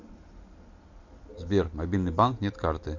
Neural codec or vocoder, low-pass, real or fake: none; 7.2 kHz; real